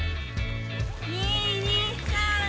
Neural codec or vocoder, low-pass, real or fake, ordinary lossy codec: none; none; real; none